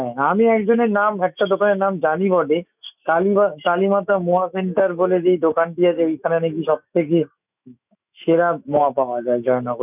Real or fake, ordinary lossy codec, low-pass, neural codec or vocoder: fake; none; 3.6 kHz; autoencoder, 48 kHz, 128 numbers a frame, DAC-VAE, trained on Japanese speech